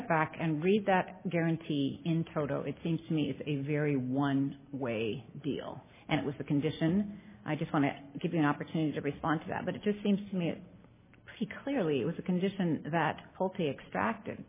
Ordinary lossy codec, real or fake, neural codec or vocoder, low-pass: MP3, 16 kbps; real; none; 3.6 kHz